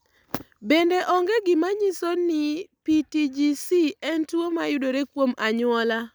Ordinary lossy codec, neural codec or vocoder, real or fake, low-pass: none; none; real; none